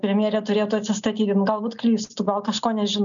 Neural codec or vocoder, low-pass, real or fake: none; 7.2 kHz; real